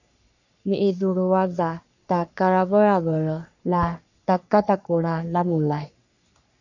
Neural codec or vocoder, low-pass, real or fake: codec, 44.1 kHz, 3.4 kbps, Pupu-Codec; 7.2 kHz; fake